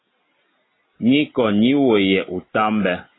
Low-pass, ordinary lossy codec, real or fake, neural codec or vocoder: 7.2 kHz; AAC, 16 kbps; real; none